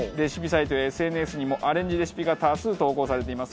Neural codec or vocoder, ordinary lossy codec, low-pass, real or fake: none; none; none; real